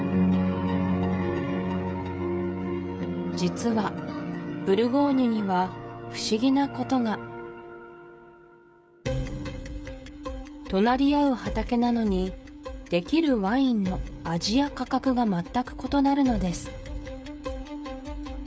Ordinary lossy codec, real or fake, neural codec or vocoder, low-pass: none; fake; codec, 16 kHz, 16 kbps, FreqCodec, smaller model; none